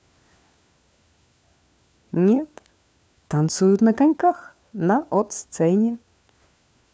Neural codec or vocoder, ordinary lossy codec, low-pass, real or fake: codec, 16 kHz, 4 kbps, FunCodec, trained on LibriTTS, 50 frames a second; none; none; fake